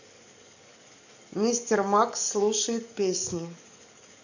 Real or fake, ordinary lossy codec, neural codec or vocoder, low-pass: fake; none; codec, 44.1 kHz, 7.8 kbps, DAC; 7.2 kHz